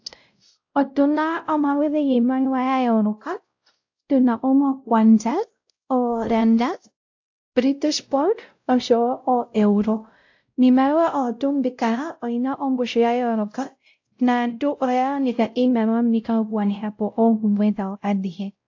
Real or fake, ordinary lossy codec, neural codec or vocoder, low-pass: fake; AAC, 48 kbps; codec, 16 kHz, 0.5 kbps, X-Codec, WavLM features, trained on Multilingual LibriSpeech; 7.2 kHz